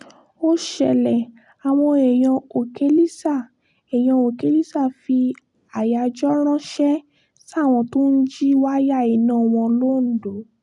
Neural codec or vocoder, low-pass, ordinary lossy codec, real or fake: none; 10.8 kHz; none; real